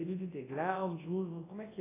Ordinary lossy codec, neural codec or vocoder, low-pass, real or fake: AAC, 16 kbps; codec, 24 kHz, 0.9 kbps, WavTokenizer, large speech release; 3.6 kHz; fake